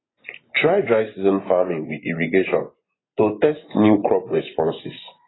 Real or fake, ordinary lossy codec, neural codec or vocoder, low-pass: fake; AAC, 16 kbps; vocoder, 24 kHz, 100 mel bands, Vocos; 7.2 kHz